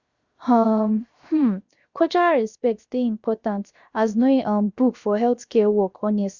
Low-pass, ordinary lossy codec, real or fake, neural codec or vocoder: 7.2 kHz; Opus, 64 kbps; fake; codec, 16 kHz, 0.7 kbps, FocalCodec